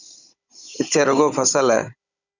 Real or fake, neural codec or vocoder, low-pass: fake; codec, 16 kHz, 16 kbps, FunCodec, trained on Chinese and English, 50 frames a second; 7.2 kHz